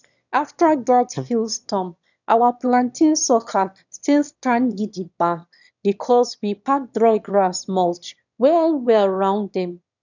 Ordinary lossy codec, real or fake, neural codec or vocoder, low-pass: none; fake; autoencoder, 22.05 kHz, a latent of 192 numbers a frame, VITS, trained on one speaker; 7.2 kHz